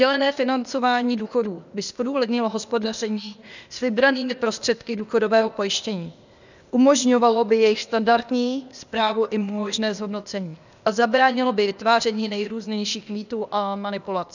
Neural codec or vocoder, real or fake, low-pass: codec, 16 kHz, 0.8 kbps, ZipCodec; fake; 7.2 kHz